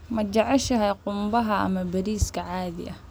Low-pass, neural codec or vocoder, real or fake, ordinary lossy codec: none; none; real; none